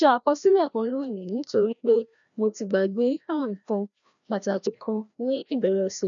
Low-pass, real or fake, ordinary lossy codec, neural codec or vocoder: 7.2 kHz; fake; none; codec, 16 kHz, 1 kbps, FreqCodec, larger model